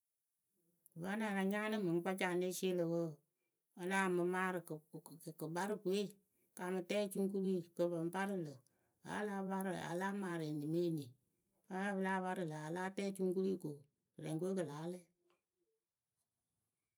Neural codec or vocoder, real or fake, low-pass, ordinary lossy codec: vocoder, 44.1 kHz, 128 mel bands every 512 samples, BigVGAN v2; fake; none; none